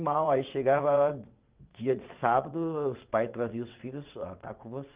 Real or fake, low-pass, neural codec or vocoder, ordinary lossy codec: fake; 3.6 kHz; vocoder, 22.05 kHz, 80 mel bands, WaveNeXt; Opus, 32 kbps